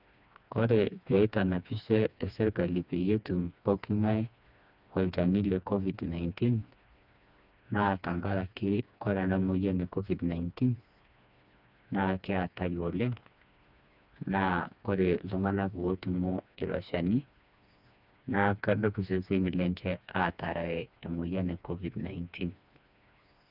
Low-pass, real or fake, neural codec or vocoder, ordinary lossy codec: 5.4 kHz; fake; codec, 16 kHz, 2 kbps, FreqCodec, smaller model; none